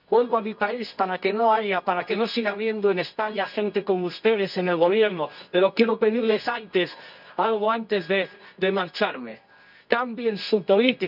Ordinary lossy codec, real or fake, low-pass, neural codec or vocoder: none; fake; 5.4 kHz; codec, 24 kHz, 0.9 kbps, WavTokenizer, medium music audio release